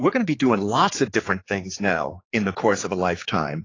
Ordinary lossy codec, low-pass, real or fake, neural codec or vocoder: AAC, 32 kbps; 7.2 kHz; fake; codec, 16 kHz, 4 kbps, X-Codec, HuBERT features, trained on general audio